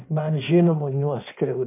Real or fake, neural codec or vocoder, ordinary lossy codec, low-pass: fake; codec, 16 kHz in and 24 kHz out, 1.1 kbps, FireRedTTS-2 codec; MP3, 32 kbps; 3.6 kHz